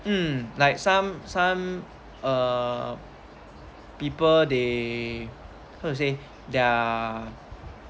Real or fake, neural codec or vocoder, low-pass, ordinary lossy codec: real; none; none; none